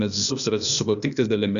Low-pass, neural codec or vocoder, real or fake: 7.2 kHz; codec, 16 kHz, 0.8 kbps, ZipCodec; fake